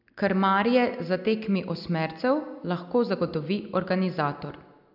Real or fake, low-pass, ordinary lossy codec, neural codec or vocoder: real; 5.4 kHz; none; none